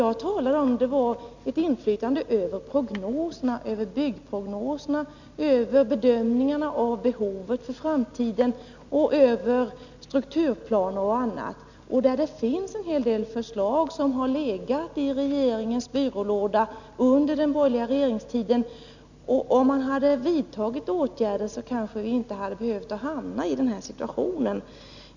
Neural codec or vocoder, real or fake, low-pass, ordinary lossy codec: none; real; 7.2 kHz; none